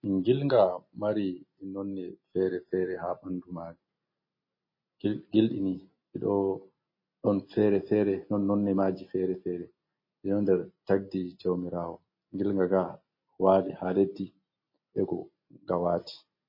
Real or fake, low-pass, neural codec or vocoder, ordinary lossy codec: real; 5.4 kHz; none; MP3, 24 kbps